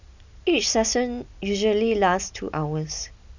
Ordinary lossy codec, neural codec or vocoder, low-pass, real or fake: none; none; 7.2 kHz; real